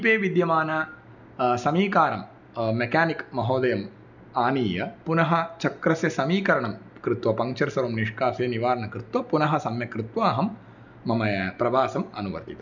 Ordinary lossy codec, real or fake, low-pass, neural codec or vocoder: none; real; 7.2 kHz; none